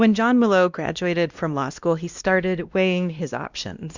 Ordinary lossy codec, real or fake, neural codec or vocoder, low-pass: Opus, 64 kbps; fake; codec, 16 kHz, 1 kbps, X-Codec, WavLM features, trained on Multilingual LibriSpeech; 7.2 kHz